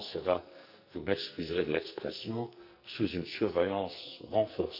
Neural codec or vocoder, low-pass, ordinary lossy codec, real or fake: codec, 44.1 kHz, 2.6 kbps, SNAC; 5.4 kHz; none; fake